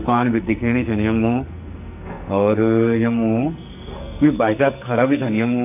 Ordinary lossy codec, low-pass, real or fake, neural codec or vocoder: none; 3.6 kHz; fake; codec, 44.1 kHz, 2.6 kbps, SNAC